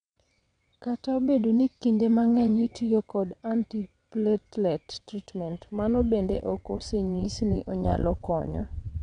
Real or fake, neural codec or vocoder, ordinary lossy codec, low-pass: fake; vocoder, 22.05 kHz, 80 mel bands, WaveNeXt; none; 9.9 kHz